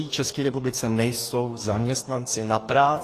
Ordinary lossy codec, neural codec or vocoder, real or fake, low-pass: AAC, 48 kbps; codec, 44.1 kHz, 2.6 kbps, DAC; fake; 14.4 kHz